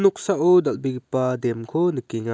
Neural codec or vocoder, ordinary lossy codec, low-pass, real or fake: none; none; none; real